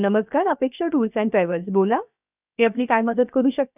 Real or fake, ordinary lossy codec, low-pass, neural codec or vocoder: fake; none; 3.6 kHz; codec, 16 kHz, 0.7 kbps, FocalCodec